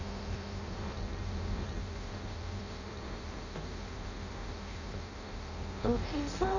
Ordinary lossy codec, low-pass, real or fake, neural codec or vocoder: none; 7.2 kHz; fake; codec, 16 kHz in and 24 kHz out, 0.6 kbps, FireRedTTS-2 codec